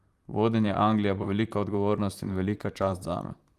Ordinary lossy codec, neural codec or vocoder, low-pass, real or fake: Opus, 32 kbps; vocoder, 44.1 kHz, 128 mel bands, Pupu-Vocoder; 14.4 kHz; fake